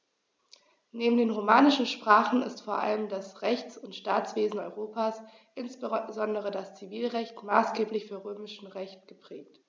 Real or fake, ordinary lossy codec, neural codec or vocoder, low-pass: real; none; none; none